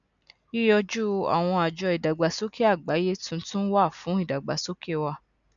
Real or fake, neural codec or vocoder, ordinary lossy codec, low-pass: real; none; none; 7.2 kHz